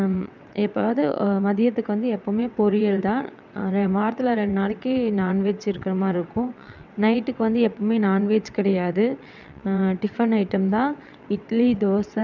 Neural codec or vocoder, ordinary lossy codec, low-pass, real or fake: vocoder, 22.05 kHz, 80 mel bands, WaveNeXt; none; 7.2 kHz; fake